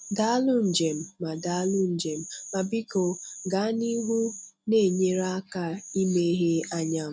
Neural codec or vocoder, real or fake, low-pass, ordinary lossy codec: none; real; none; none